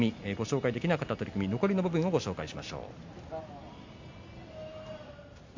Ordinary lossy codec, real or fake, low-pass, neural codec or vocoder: MP3, 48 kbps; real; 7.2 kHz; none